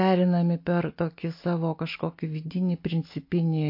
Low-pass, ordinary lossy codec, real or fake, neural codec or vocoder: 5.4 kHz; MP3, 32 kbps; real; none